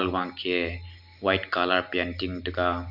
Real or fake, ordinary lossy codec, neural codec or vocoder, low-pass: real; none; none; 5.4 kHz